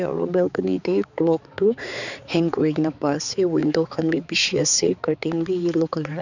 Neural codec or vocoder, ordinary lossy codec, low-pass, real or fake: codec, 16 kHz, 4 kbps, X-Codec, HuBERT features, trained on balanced general audio; none; 7.2 kHz; fake